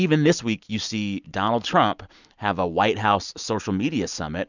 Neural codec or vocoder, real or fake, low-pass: none; real; 7.2 kHz